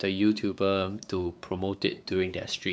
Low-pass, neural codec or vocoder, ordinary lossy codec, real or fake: none; codec, 16 kHz, 4 kbps, X-Codec, WavLM features, trained on Multilingual LibriSpeech; none; fake